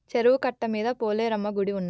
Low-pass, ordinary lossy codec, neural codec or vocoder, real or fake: none; none; none; real